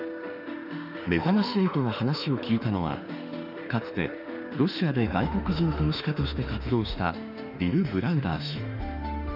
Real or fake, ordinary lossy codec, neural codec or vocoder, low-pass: fake; none; autoencoder, 48 kHz, 32 numbers a frame, DAC-VAE, trained on Japanese speech; 5.4 kHz